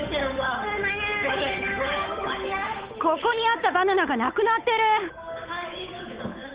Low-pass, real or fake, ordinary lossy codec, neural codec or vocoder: 3.6 kHz; fake; Opus, 32 kbps; codec, 16 kHz, 8 kbps, FunCodec, trained on Chinese and English, 25 frames a second